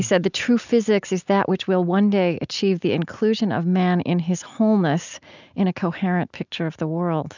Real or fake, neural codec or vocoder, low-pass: real; none; 7.2 kHz